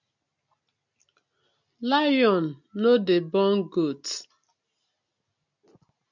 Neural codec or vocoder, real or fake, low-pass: none; real; 7.2 kHz